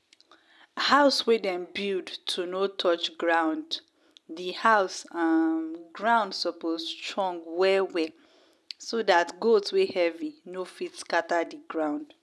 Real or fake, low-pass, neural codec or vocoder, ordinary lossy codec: real; none; none; none